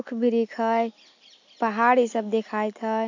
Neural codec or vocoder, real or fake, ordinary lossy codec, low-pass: none; real; AAC, 48 kbps; 7.2 kHz